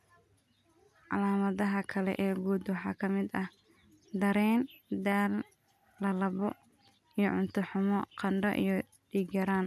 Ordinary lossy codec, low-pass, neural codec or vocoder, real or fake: MP3, 96 kbps; 14.4 kHz; none; real